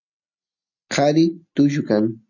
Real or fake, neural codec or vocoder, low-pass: real; none; 7.2 kHz